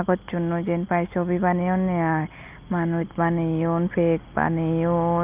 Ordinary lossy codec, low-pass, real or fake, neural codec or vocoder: Opus, 24 kbps; 3.6 kHz; real; none